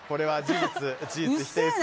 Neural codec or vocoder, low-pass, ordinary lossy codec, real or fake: none; none; none; real